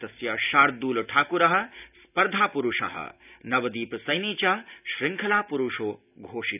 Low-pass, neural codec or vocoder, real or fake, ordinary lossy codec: 3.6 kHz; none; real; none